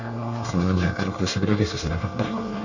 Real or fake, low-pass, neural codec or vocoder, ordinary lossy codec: fake; 7.2 kHz; codec, 24 kHz, 1 kbps, SNAC; none